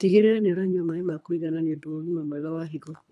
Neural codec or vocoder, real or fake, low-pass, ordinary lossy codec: codec, 24 kHz, 3 kbps, HILCodec; fake; none; none